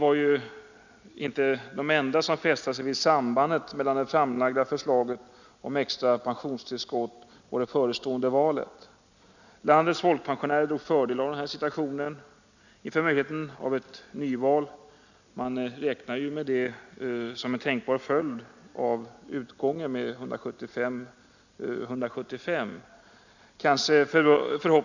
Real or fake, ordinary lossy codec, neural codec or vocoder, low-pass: real; none; none; 7.2 kHz